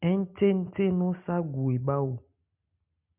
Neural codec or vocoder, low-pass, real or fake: none; 3.6 kHz; real